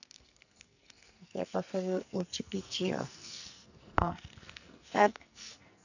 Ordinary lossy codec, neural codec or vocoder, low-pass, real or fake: none; codec, 44.1 kHz, 2.6 kbps, SNAC; 7.2 kHz; fake